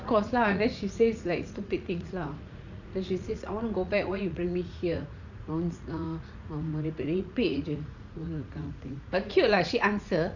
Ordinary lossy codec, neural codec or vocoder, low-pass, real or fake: none; vocoder, 44.1 kHz, 80 mel bands, Vocos; 7.2 kHz; fake